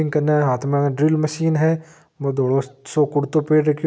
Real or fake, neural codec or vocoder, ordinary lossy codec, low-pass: real; none; none; none